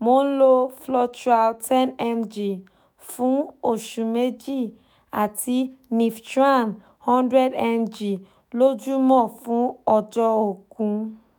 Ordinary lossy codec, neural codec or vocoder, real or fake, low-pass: none; autoencoder, 48 kHz, 128 numbers a frame, DAC-VAE, trained on Japanese speech; fake; none